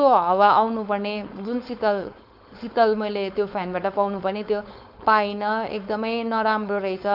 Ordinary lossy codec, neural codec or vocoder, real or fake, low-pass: none; codec, 16 kHz, 4.8 kbps, FACodec; fake; 5.4 kHz